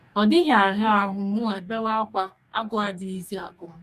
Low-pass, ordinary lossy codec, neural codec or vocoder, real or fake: 14.4 kHz; MP3, 96 kbps; codec, 44.1 kHz, 2.6 kbps, DAC; fake